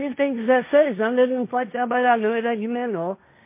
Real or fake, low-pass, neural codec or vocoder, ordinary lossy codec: fake; 3.6 kHz; codec, 16 kHz, 1.1 kbps, Voila-Tokenizer; MP3, 24 kbps